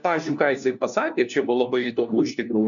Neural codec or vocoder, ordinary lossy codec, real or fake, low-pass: codec, 16 kHz, 1 kbps, FunCodec, trained on LibriTTS, 50 frames a second; MP3, 96 kbps; fake; 7.2 kHz